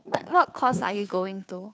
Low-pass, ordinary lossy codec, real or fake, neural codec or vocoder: none; none; fake; codec, 16 kHz, 2 kbps, FunCodec, trained on Chinese and English, 25 frames a second